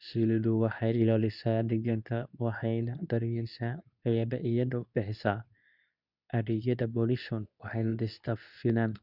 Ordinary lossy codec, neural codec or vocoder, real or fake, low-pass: none; codec, 24 kHz, 0.9 kbps, WavTokenizer, medium speech release version 2; fake; 5.4 kHz